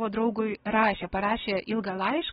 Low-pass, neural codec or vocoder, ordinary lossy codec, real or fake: 7.2 kHz; none; AAC, 16 kbps; real